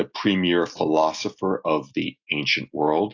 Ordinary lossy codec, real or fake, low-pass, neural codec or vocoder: AAC, 48 kbps; real; 7.2 kHz; none